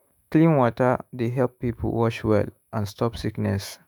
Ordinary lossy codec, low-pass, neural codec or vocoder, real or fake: none; none; autoencoder, 48 kHz, 128 numbers a frame, DAC-VAE, trained on Japanese speech; fake